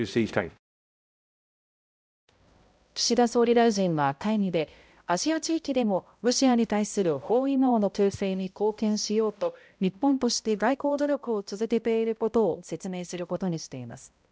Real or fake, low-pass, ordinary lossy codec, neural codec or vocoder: fake; none; none; codec, 16 kHz, 0.5 kbps, X-Codec, HuBERT features, trained on balanced general audio